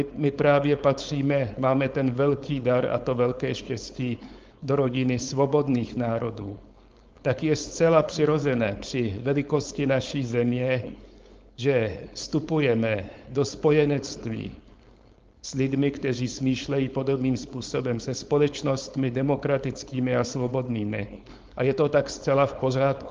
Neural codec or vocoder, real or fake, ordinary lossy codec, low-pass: codec, 16 kHz, 4.8 kbps, FACodec; fake; Opus, 24 kbps; 7.2 kHz